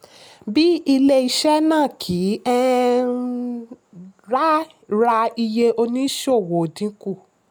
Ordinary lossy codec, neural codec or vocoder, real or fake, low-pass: none; none; real; none